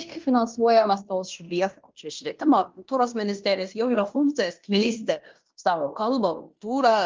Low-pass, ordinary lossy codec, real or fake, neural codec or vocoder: 7.2 kHz; Opus, 32 kbps; fake; codec, 16 kHz in and 24 kHz out, 0.9 kbps, LongCat-Audio-Codec, fine tuned four codebook decoder